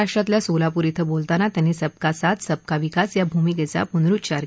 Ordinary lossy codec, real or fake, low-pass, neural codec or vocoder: none; real; none; none